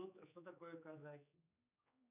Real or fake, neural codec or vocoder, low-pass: fake; codec, 16 kHz, 4 kbps, X-Codec, HuBERT features, trained on general audio; 3.6 kHz